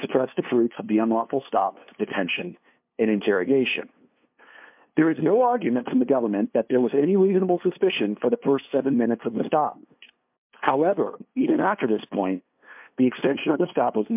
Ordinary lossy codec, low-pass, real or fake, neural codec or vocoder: MP3, 32 kbps; 3.6 kHz; fake; codec, 16 kHz, 2 kbps, FunCodec, trained on LibriTTS, 25 frames a second